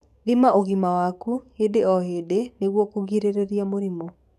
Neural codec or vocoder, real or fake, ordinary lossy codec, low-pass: codec, 44.1 kHz, 7.8 kbps, DAC; fake; none; 14.4 kHz